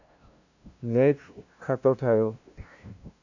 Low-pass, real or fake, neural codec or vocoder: 7.2 kHz; fake; codec, 16 kHz, 0.5 kbps, FunCodec, trained on LibriTTS, 25 frames a second